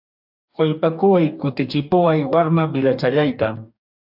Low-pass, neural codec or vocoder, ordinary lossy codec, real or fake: 5.4 kHz; codec, 44.1 kHz, 2.6 kbps, DAC; AAC, 48 kbps; fake